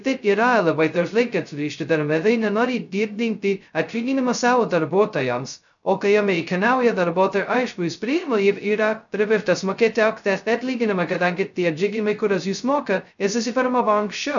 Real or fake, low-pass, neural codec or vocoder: fake; 7.2 kHz; codec, 16 kHz, 0.2 kbps, FocalCodec